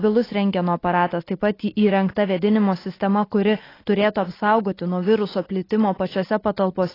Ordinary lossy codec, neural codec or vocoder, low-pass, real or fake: AAC, 24 kbps; none; 5.4 kHz; real